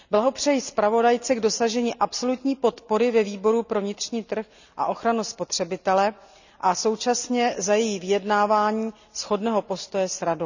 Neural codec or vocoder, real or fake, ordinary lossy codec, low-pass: none; real; none; 7.2 kHz